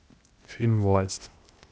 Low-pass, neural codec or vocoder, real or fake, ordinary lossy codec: none; codec, 16 kHz, 0.8 kbps, ZipCodec; fake; none